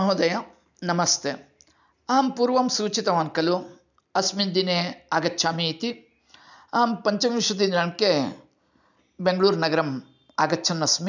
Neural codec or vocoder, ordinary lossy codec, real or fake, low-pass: none; none; real; 7.2 kHz